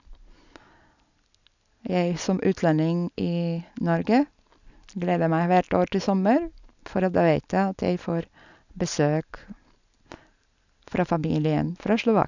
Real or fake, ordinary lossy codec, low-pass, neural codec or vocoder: real; none; 7.2 kHz; none